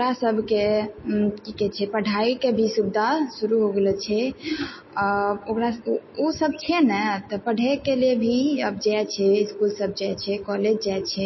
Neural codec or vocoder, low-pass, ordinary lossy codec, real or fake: none; 7.2 kHz; MP3, 24 kbps; real